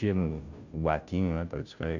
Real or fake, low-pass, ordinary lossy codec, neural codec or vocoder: fake; 7.2 kHz; none; codec, 16 kHz, 0.5 kbps, FunCodec, trained on Chinese and English, 25 frames a second